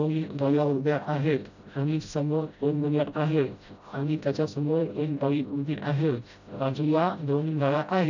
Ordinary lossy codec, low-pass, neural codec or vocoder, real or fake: none; 7.2 kHz; codec, 16 kHz, 0.5 kbps, FreqCodec, smaller model; fake